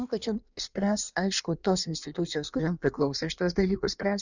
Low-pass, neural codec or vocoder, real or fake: 7.2 kHz; codec, 16 kHz in and 24 kHz out, 1.1 kbps, FireRedTTS-2 codec; fake